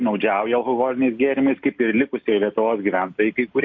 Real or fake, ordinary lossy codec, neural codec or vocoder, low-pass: real; MP3, 48 kbps; none; 7.2 kHz